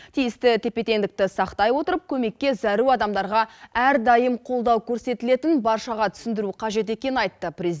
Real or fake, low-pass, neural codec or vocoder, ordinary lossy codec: real; none; none; none